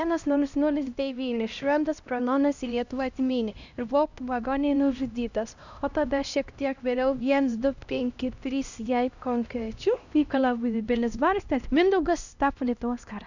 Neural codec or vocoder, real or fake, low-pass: codec, 16 kHz, 1 kbps, X-Codec, HuBERT features, trained on LibriSpeech; fake; 7.2 kHz